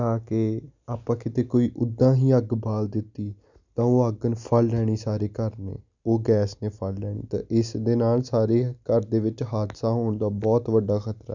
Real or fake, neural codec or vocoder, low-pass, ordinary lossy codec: real; none; 7.2 kHz; none